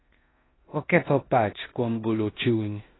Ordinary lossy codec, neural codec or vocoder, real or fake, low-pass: AAC, 16 kbps; codec, 16 kHz in and 24 kHz out, 0.9 kbps, LongCat-Audio-Codec, four codebook decoder; fake; 7.2 kHz